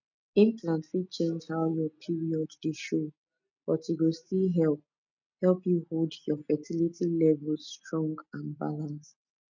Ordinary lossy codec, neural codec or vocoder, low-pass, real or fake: none; none; 7.2 kHz; real